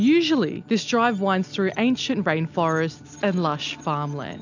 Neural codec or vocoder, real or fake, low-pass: none; real; 7.2 kHz